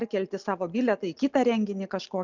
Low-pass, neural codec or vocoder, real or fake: 7.2 kHz; none; real